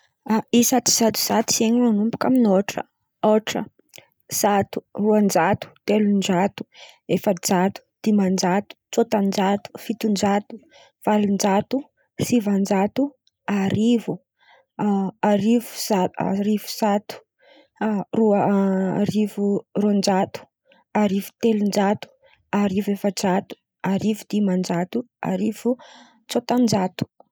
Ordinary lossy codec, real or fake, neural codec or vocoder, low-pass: none; real; none; none